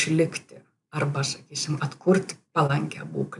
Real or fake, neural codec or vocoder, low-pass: fake; vocoder, 44.1 kHz, 128 mel bands every 256 samples, BigVGAN v2; 10.8 kHz